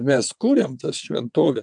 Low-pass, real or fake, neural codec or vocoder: 9.9 kHz; fake; vocoder, 22.05 kHz, 80 mel bands, WaveNeXt